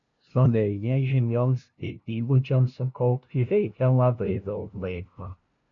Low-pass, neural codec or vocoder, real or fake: 7.2 kHz; codec, 16 kHz, 0.5 kbps, FunCodec, trained on LibriTTS, 25 frames a second; fake